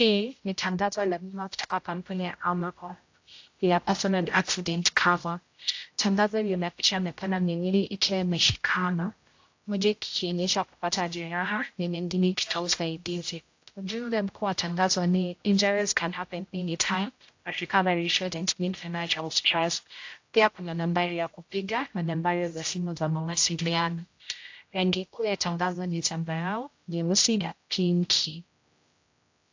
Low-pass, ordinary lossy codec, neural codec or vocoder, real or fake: 7.2 kHz; AAC, 48 kbps; codec, 16 kHz, 0.5 kbps, X-Codec, HuBERT features, trained on general audio; fake